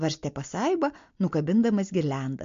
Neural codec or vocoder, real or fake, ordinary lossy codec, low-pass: none; real; MP3, 48 kbps; 7.2 kHz